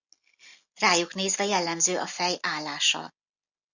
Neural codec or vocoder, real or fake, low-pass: none; real; 7.2 kHz